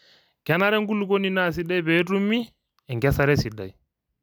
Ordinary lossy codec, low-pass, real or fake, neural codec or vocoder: none; none; real; none